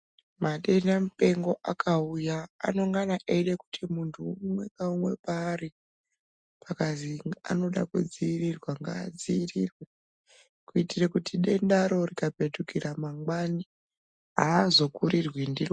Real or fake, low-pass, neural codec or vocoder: real; 9.9 kHz; none